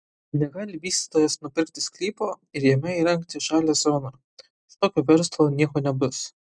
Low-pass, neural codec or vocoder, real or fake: 9.9 kHz; none; real